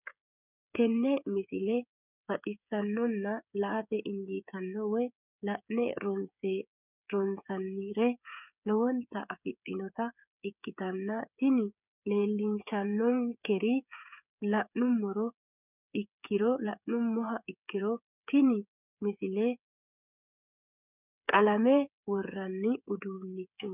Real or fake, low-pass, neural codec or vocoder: fake; 3.6 kHz; codec, 16 kHz, 8 kbps, FreqCodec, smaller model